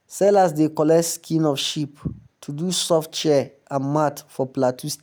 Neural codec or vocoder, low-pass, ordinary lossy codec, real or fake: none; none; none; real